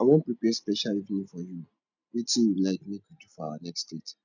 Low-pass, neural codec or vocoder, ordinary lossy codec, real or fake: 7.2 kHz; none; none; real